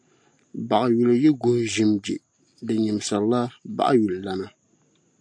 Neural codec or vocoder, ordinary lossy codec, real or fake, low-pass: none; AAC, 64 kbps; real; 9.9 kHz